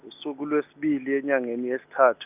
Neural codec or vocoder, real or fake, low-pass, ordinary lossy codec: none; real; 3.6 kHz; none